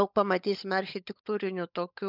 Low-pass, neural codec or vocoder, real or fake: 5.4 kHz; codec, 16 kHz, 16 kbps, FunCodec, trained on LibriTTS, 50 frames a second; fake